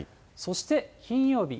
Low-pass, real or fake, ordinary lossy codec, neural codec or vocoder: none; real; none; none